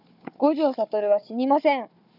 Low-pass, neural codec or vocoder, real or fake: 5.4 kHz; codec, 16 kHz, 4 kbps, FunCodec, trained on Chinese and English, 50 frames a second; fake